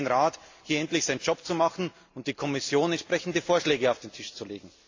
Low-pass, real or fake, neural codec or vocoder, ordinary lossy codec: 7.2 kHz; real; none; AAC, 48 kbps